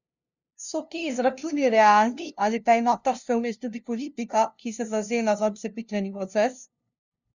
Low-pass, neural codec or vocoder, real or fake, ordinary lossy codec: 7.2 kHz; codec, 16 kHz, 0.5 kbps, FunCodec, trained on LibriTTS, 25 frames a second; fake; none